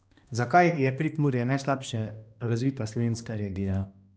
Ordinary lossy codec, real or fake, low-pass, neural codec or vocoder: none; fake; none; codec, 16 kHz, 1 kbps, X-Codec, HuBERT features, trained on balanced general audio